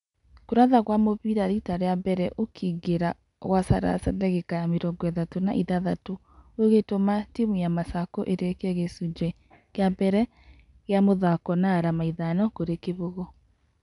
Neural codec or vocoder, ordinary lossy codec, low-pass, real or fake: none; none; 10.8 kHz; real